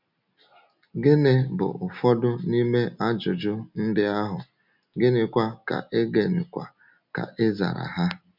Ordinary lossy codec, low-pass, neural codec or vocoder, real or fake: none; 5.4 kHz; none; real